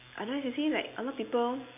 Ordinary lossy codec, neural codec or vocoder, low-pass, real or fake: MP3, 24 kbps; none; 3.6 kHz; real